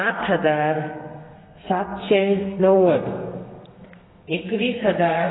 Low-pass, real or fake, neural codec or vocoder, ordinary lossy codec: 7.2 kHz; fake; codec, 32 kHz, 1.9 kbps, SNAC; AAC, 16 kbps